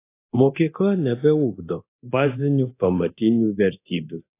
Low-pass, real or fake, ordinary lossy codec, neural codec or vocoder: 3.6 kHz; fake; AAC, 24 kbps; codec, 24 kHz, 0.9 kbps, DualCodec